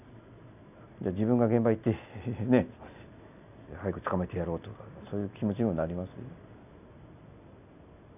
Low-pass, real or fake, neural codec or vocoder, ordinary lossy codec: 3.6 kHz; real; none; none